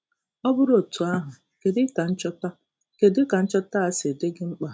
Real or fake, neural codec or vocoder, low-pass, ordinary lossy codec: real; none; none; none